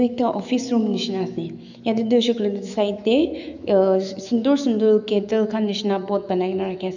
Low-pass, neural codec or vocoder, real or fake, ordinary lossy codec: 7.2 kHz; autoencoder, 48 kHz, 128 numbers a frame, DAC-VAE, trained on Japanese speech; fake; none